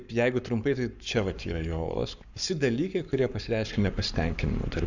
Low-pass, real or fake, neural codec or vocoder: 7.2 kHz; fake; codec, 44.1 kHz, 7.8 kbps, Pupu-Codec